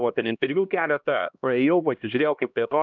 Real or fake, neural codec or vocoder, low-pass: fake; codec, 16 kHz, 1 kbps, X-Codec, HuBERT features, trained on LibriSpeech; 7.2 kHz